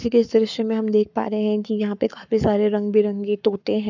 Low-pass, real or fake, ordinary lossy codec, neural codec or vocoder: 7.2 kHz; fake; none; codec, 44.1 kHz, 7.8 kbps, Pupu-Codec